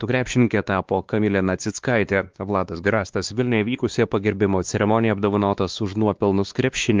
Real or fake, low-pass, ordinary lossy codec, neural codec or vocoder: fake; 7.2 kHz; Opus, 32 kbps; codec, 16 kHz, 2 kbps, X-Codec, WavLM features, trained on Multilingual LibriSpeech